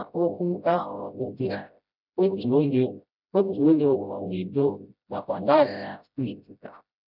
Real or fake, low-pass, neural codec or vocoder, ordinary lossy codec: fake; 5.4 kHz; codec, 16 kHz, 0.5 kbps, FreqCodec, smaller model; none